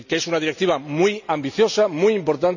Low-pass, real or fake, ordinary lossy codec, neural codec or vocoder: 7.2 kHz; real; none; none